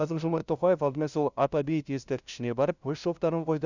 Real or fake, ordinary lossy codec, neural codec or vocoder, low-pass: fake; none; codec, 16 kHz, 0.5 kbps, FunCodec, trained on LibriTTS, 25 frames a second; 7.2 kHz